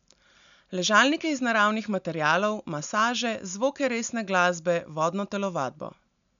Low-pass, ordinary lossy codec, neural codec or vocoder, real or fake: 7.2 kHz; none; none; real